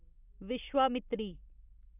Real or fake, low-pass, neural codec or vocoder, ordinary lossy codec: real; 3.6 kHz; none; none